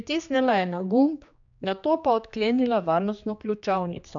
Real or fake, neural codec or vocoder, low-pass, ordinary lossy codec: fake; codec, 16 kHz, 2 kbps, X-Codec, HuBERT features, trained on general audio; 7.2 kHz; none